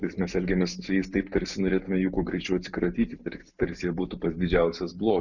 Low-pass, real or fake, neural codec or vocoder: 7.2 kHz; real; none